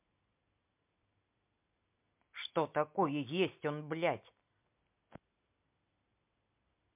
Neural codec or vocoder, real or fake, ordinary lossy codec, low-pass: none; real; MP3, 32 kbps; 3.6 kHz